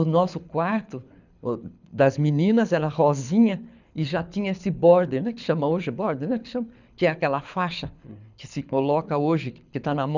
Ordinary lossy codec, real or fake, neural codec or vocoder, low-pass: none; fake; codec, 24 kHz, 6 kbps, HILCodec; 7.2 kHz